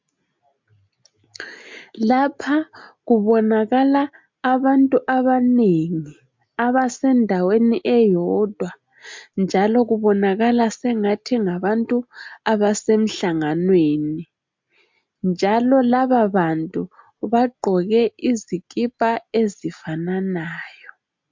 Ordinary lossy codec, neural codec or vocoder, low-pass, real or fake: MP3, 64 kbps; none; 7.2 kHz; real